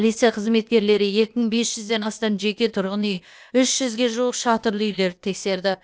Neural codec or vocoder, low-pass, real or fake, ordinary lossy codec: codec, 16 kHz, 0.8 kbps, ZipCodec; none; fake; none